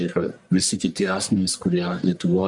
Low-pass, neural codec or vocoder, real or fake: 10.8 kHz; codec, 44.1 kHz, 1.7 kbps, Pupu-Codec; fake